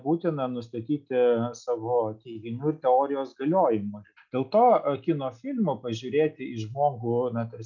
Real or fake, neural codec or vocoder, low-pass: real; none; 7.2 kHz